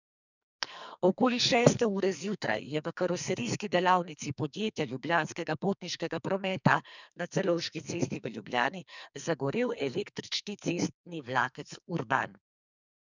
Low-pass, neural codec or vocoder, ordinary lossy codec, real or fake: 7.2 kHz; codec, 44.1 kHz, 2.6 kbps, SNAC; none; fake